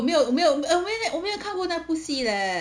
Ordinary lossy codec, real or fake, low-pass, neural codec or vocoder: none; real; 9.9 kHz; none